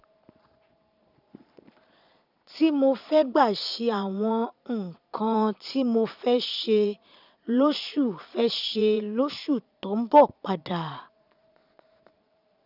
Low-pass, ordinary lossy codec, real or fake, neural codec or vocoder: 5.4 kHz; none; fake; vocoder, 22.05 kHz, 80 mel bands, Vocos